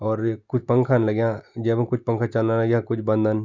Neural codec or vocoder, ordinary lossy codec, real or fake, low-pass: none; Opus, 64 kbps; real; 7.2 kHz